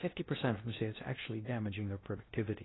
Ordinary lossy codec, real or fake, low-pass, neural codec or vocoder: AAC, 16 kbps; fake; 7.2 kHz; codec, 16 kHz in and 24 kHz out, 0.6 kbps, FocalCodec, streaming, 2048 codes